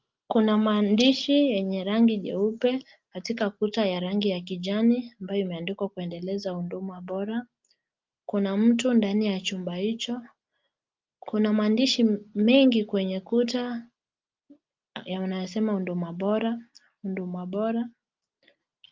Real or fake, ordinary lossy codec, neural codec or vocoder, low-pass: real; Opus, 24 kbps; none; 7.2 kHz